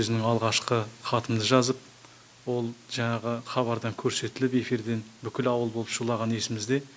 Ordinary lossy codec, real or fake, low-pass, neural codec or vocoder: none; real; none; none